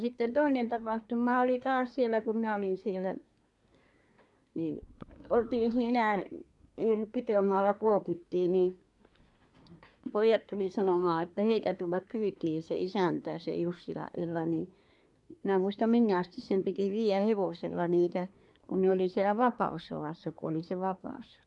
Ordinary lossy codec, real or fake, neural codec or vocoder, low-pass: none; fake; codec, 24 kHz, 1 kbps, SNAC; 10.8 kHz